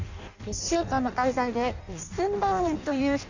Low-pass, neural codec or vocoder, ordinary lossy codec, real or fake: 7.2 kHz; codec, 16 kHz in and 24 kHz out, 0.6 kbps, FireRedTTS-2 codec; none; fake